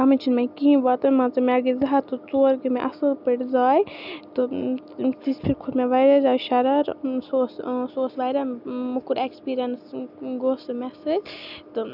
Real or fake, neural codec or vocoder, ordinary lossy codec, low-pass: real; none; none; 5.4 kHz